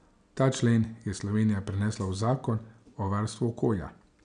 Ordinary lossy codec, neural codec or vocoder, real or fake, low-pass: none; none; real; 9.9 kHz